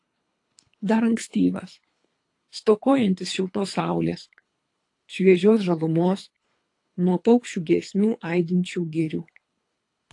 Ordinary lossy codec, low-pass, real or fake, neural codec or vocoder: AAC, 48 kbps; 10.8 kHz; fake; codec, 24 kHz, 3 kbps, HILCodec